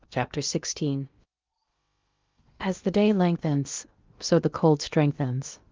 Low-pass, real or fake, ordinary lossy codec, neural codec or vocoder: 7.2 kHz; fake; Opus, 32 kbps; codec, 16 kHz in and 24 kHz out, 0.8 kbps, FocalCodec, streaming, 65536 codes